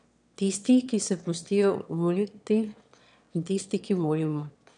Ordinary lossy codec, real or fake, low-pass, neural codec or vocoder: none; fake; 9.9 kHz; autoencoder, 22.05 kHz, a latent of 192 numbers a frame, VITS, trained on one speaker